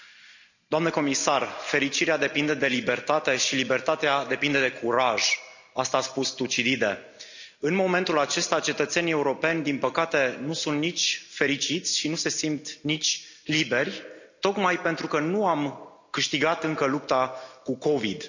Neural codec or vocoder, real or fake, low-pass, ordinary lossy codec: none; real; 7.2 kHz; none